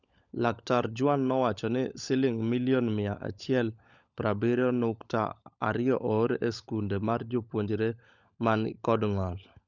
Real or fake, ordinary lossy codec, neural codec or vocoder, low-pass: fake; none; codec, 16 kHz, 16 kbps, FunCodec, trained on LibriTTS, 50 frames a second; 7.2 kHz